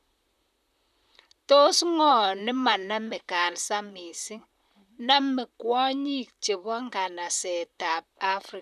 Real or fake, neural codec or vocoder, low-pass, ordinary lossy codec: fake; vocoder, 44.1 kHz, 128 mel bands, Pupu-Vocoder; 14.4 kHz; none